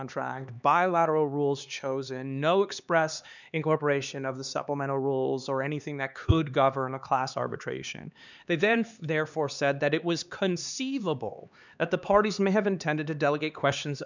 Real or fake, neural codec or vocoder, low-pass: fake; codec, 16 kHz, 4 kbps, X-Codec, HuBERT features, trained on LibriSpeech; 7.2 kHz